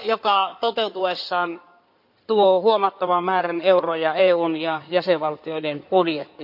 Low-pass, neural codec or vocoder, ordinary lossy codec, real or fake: 5.4 kHz; codec, 16 kHz in and 24 kHz out, 2.2 kbps, FireRedTTS-2 codec; none; fake